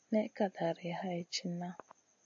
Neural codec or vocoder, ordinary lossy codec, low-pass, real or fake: none; AAC, 64 kbps; 7.2 kHz; real